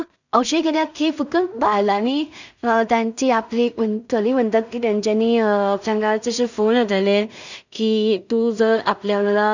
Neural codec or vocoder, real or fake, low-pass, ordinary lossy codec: codec, 16 kHz in and 24 kHz out, 0.4 kbps, LongCat-Audio-Codec, two codebook decoder; fake; 7.2 kHz; none